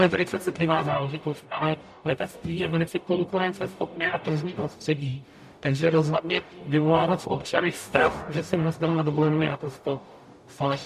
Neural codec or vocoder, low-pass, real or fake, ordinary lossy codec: codec, 44.1 kHz, 0.9 kbps, DAC; 14.4 kHz; fake; MP3, 96 kbps